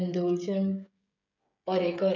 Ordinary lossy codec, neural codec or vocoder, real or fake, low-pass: none; codec, 16 kHz, 16 kbps, FreqCodec, smaller model; fake; 7.2 kHz